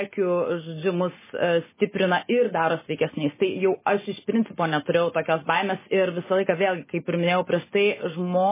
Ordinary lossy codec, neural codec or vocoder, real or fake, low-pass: MP3, 16 kbps; none; real; 3.6 kHz